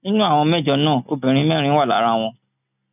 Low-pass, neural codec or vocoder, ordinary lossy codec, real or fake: 3.6 kHz; none; none; real